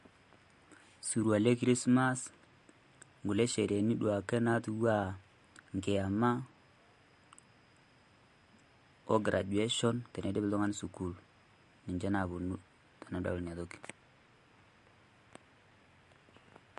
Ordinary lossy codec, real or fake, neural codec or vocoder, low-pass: MP3, 48 kbps; real; none; 14.4 kHz